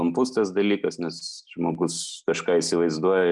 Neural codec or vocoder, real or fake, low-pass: none; real; 10.8 kHz